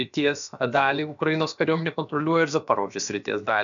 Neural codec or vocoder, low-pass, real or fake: codec, 16 kHz, about 1 kbps, DyCAST, with the encoder's durations; 7.2 kHz; fake